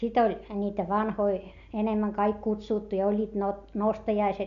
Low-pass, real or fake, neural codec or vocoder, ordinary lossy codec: 7.2 kHz; real; none; none